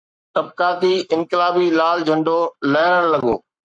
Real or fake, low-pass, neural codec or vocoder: fake; 9.9 kHz; codec, 44.1 kHz, 7.8 kbps, Pupu-Codec